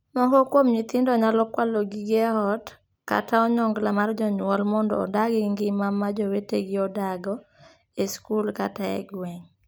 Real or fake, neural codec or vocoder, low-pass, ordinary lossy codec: real; none; none; none